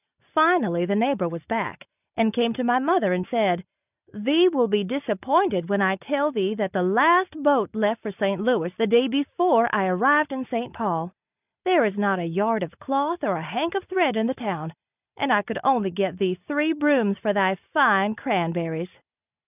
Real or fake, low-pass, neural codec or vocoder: real; 3.6 kHz; none